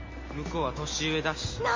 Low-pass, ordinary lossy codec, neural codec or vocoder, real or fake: 7.2 kHz; MP3, 32 kbps; none; real